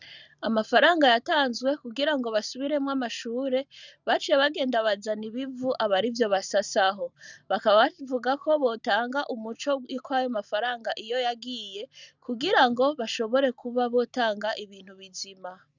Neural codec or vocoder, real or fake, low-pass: none; real; 7.2 kHz